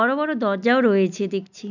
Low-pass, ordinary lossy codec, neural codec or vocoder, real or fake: 7.2 kHz; none; none; real